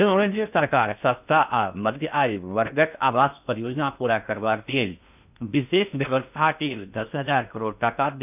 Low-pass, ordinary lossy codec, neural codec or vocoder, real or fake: 3.6 kHz; none; codec, 16 kHz in and 24 kHz out, 0.6 kbps, FocalCodec, streaming, 2048 codes; fake